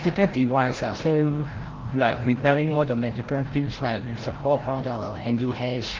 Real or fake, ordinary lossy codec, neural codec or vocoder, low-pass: fake; Opus, 16 kbps; codec, 16 kHz, 0.5 kbps, FreqCodec, larger model; 7.2 kHz